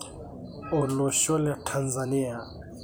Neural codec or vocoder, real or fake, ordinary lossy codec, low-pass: vocoder, 44.1 kHz, 128 mel bands every 256 samples, BigVGAN v2; fake; none; none